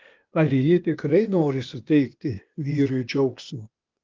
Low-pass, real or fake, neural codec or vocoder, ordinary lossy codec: 7.2 kHz; fake; codec, 16 kHz, 0.8 kbps, ZipCodec; Opus, 32 kbps